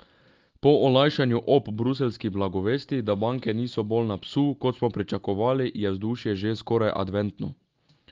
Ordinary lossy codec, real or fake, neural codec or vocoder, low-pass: Opus, 32 kbps; real; none; 7.2 kHz